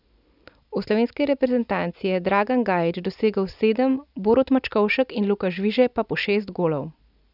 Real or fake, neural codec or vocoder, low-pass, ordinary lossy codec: real; none; 5.4 kHz; none